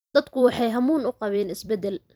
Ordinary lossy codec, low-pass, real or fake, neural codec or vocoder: none; none; fake; vocoder, 44.1 kHz, 128 mel bands every 256 samples, BigVGAN v2